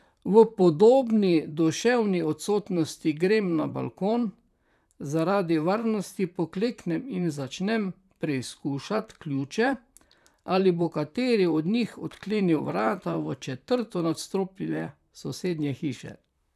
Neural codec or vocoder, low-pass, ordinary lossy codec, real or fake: vocoder, 44.1 kHz, 128 mel bands, Pupu-Vocoder; 14.4 kHz; none; fake